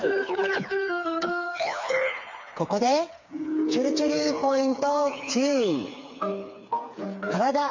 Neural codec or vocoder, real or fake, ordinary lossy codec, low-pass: codec, 16 kHz, 4 kbps, FreqCodec, smaller model; fake; MP3, 48 kbps; 7.2 kHz